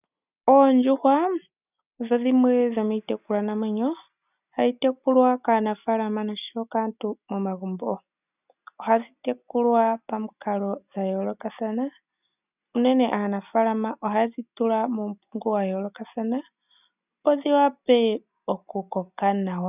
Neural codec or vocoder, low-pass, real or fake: none; 3.6 kHz; real